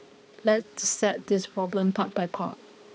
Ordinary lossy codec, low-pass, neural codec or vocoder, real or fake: none; none; codec, 16 kHz, 2 kbps, X-Codec, HuBERT features, trained on balanced general audio; fake